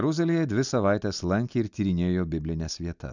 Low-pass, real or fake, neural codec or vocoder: 7.2 kHz; real; none